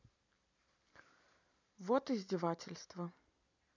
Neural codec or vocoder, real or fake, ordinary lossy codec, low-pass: none; real; none; 7.2 kHz